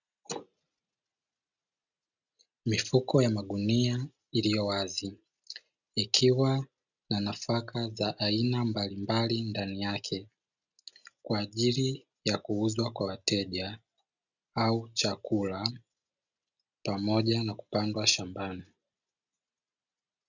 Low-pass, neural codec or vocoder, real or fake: 7.2 kHz; none; real